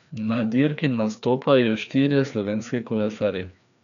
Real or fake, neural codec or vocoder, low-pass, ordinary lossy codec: fake; codec, 16 kHz, 2 kbps, FreqCodec, larger model; 7.2 kHz; none